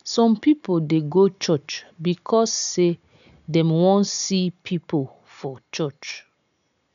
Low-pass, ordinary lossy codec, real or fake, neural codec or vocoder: 7.2 kHz; none; real; none